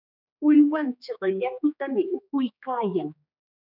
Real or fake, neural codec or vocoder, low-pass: fake; codec, 16 kHz, 2 kbps, X-Codec, HuBERT features, trained on general audio; 5.4 kHz